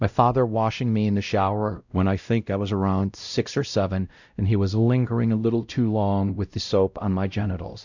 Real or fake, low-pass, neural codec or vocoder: fake; 7.2 kHz; codec, 16 kHz, 0.5 kbps, X-Codec, WavLM features, trained on Multilingual LibriSpeech